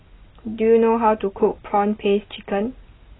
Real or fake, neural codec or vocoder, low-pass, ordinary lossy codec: real; none; 7.2 kHz; AAC, 16 kbps